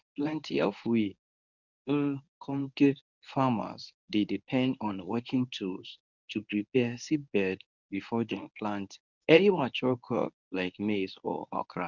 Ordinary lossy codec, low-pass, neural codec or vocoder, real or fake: none; 7.2 kHz; codec, 24 kHz, 0.9 kbps, WavTokenizer, medium speech release version 1; fake